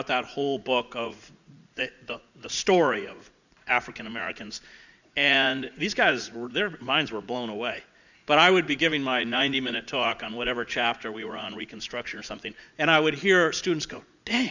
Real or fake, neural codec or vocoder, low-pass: fake; vocoder, 44.1 kHz, 80 mel bands, Vocos; 7.2 kHz